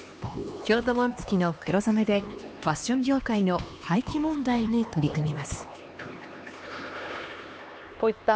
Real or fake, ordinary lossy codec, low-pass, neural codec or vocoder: fake; none; none; codec, 16 kHz, 2 kbps, X-Codec, HuBERT features, trained on LibriSpeech